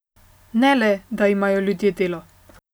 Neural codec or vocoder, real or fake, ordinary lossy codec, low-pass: none; real; none; none